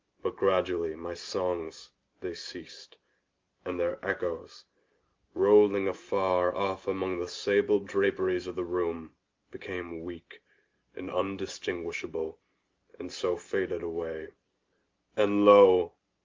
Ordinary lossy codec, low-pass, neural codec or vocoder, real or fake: Opus, 32 kbps; 7.2 kHz; none; real